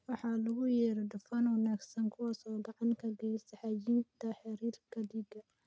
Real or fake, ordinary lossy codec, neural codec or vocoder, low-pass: real; none; none; none